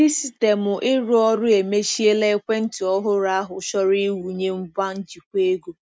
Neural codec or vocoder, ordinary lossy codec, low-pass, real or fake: none; none; none; real